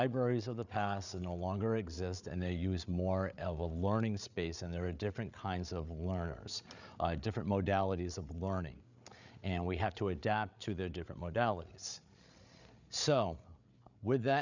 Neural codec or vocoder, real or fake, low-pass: codec, 16 kHz, 8 kbps, FreqCodec, larger model; fake; 7.2 kHz